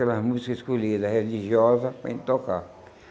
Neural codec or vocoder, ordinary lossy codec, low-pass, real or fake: none; none; none; real